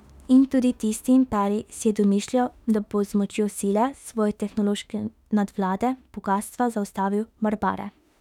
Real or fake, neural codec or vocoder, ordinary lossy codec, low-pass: fake; autoencoder, 48 kHz, 32 numbers a frame, DAC-VAE, trained on Japanese speech; none; 19.8 kHz